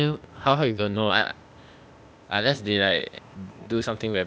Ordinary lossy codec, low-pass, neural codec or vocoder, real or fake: none; none; codec, 16 kHz, 0.8 kbps, ZipCodec; fake